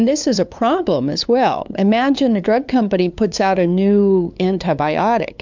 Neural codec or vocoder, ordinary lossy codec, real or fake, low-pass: codec, 16 kHz, 2 kbps, FunCodec, trained on LibriTTS, 25 frames a second; MP3, 64 kbps; fake; 7.2 kHz